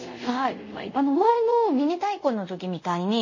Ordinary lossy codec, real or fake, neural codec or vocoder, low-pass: MP3, 32 kbps; fake; codec, 24 kHz, 0.5 kbps, DualCodec; 7.2 kHz